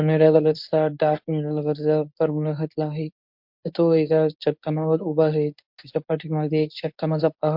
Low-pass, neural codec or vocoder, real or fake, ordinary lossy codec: 5.4 kHz; codec, 24 kHz, 0.9 kbps, WavTokenizer, medium speech release version 1; fake; MP3, 48 kbps